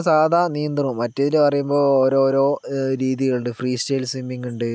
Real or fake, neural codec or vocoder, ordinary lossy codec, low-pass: real; none; none; none